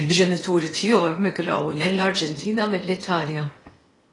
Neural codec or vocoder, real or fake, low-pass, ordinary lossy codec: codec, 16 kHz in and 24 kHz out, 0.8 kbps, FocalCodec, streaming, 65536 codes; fake; 10.8 kHz; AAC, 32 kbps